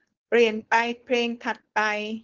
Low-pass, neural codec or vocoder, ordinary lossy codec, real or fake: 7.2 kHz; codec, 16 kHz, 4.8 kbps, FACodec; Opus, 16 kbps; fake